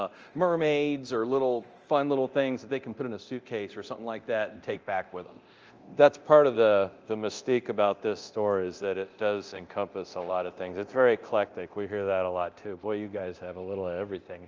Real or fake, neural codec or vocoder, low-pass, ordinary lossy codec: fake; codec, 24 kHz, 0.9 kbps, DualCodec; 7.2 kHz; Opus, 24 kbps